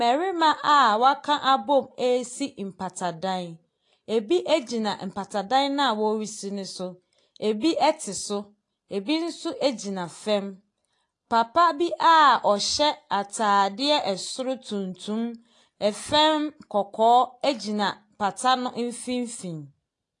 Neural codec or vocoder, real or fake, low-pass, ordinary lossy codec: none; real; 10.8 kHz; AAC, 48 kbps